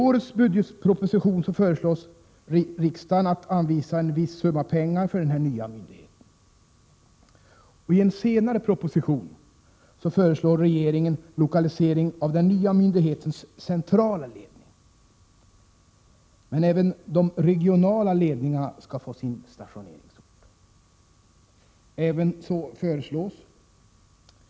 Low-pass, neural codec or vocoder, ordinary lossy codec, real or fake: none; none; none; real